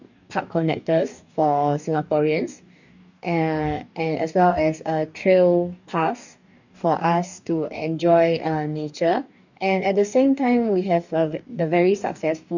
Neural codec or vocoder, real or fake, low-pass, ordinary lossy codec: codec, 44.1 kHz, 2.6 kbps, DAC; fake; 7.2 kHz; none